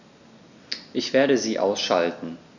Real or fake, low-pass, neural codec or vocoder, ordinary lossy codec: real; 7.2 kHz; none; none